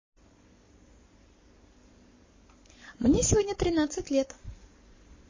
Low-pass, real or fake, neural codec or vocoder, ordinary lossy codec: 7.2 kHz; fake; codec, 44.1 kHz, 7.8 kbps, Pupu-Codec; MP3, 32 kbps